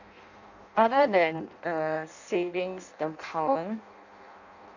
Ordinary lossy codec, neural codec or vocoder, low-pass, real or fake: none; codec, 16 kHz in and 24 kHz out, 0.6 kbps, FireRedTTS-2 codec; 7.2 kHz; fake